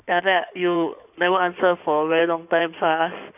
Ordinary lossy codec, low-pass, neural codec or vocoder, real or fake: none; 3.6 kHz; codec, 16 kHz in and 24 kHz out, 2.2 kbps, FireRedTTS-2 codec; fake